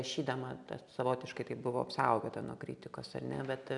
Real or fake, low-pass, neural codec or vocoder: real; 10.8 kHz; none